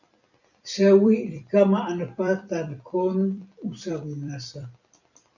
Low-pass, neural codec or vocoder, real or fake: 7.2 kHz; vocoder, 44.1 kHz, 128 mel bands every 256 samples, BigVGAN v2; fake